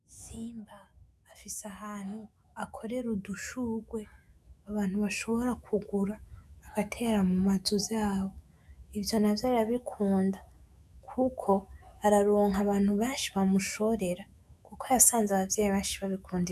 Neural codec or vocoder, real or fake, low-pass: autoencoder, 48 kHz, 128 numbers a frame, DAC-VAE, trained on Japanese speech; fake; 14.4 kHz